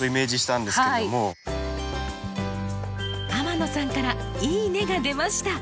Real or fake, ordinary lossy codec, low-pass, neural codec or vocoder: real; none; none; none